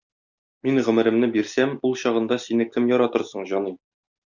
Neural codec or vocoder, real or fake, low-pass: none; real; 7.2 kHz